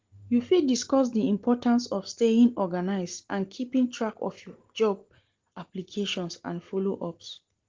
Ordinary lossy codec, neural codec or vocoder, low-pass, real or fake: Opus, 16 kbps; none; 7.2 kHz; real